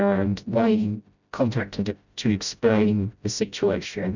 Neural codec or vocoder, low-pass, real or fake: codec, 16 kHz, 0.5 kbps, FreqCodec, smaller model; 7.2 kHz; fake